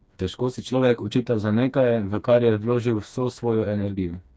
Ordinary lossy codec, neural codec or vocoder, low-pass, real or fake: none; codec, 16 kHz, 2 kbps, FreqCodec, smaller model; none; fake